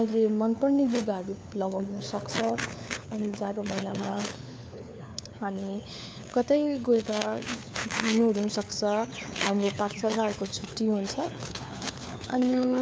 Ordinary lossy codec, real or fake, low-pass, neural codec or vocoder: none; fake; none; codec, 16 kHz, 4 kbps, FunCodec, trained on LibriTTS, 50 frames a second